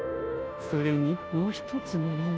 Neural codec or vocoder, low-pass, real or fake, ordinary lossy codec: codec, 16 kHz, 0.5 kbps, FunCodec, trained on Chinese and English, 25 frames a second; none; fake; none